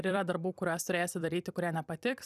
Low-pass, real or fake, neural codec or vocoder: 14.4 kHz; fake; vocoder, 44.1 kHz, 128 mel bands every 256 samples, BigVGAN v2